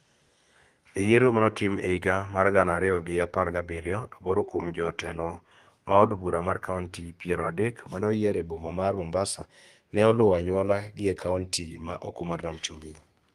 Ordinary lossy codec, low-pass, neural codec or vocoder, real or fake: Opus, 32 kbps; 14.4 kHz; codec, 32 kHz, 1.9 kbps, SNAC; fake